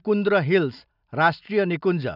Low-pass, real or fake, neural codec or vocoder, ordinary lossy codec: 5.4 kHz; real; none; none